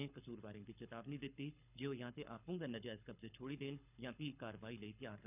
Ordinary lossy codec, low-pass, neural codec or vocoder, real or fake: none; 3.6 kHz; codec, 24 kHz, 6 kbps, HILCodec; fake